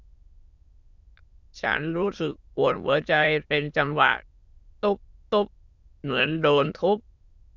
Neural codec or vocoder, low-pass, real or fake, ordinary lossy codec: autoencoder, 22.05 kHz, a latent of 192 numbers a frame, VITS, trained on many speakers; 7.2 kHz; fake; Opus, 64 kbps